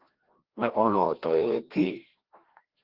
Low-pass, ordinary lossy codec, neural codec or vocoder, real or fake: 5.4 kHz; Opus, 16 kbps; codec, 16 kHz, 1 kbps, FreqCodec, larger model; fake